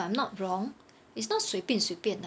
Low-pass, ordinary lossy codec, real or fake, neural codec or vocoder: none; none; real; none